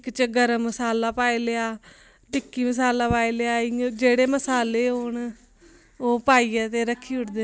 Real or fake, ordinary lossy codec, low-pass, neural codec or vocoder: real; none; none; none